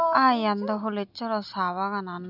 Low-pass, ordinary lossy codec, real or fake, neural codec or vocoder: 5.4 kHz; none; real; none